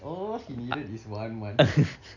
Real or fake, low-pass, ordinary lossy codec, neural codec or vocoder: real; 7.2 kHz; none; none